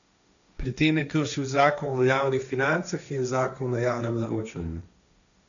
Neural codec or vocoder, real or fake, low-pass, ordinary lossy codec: codec, 16 kHz, 1.1 kbps, Voila-Tokenizer; fake; 7.2 kHz; none